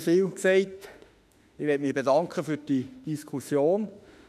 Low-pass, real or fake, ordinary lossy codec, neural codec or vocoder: 14.4 kHz; fake; none; autoencoder, 48 kHz, 32 numbers a frame, DAC-VAE, trained on Japanese speech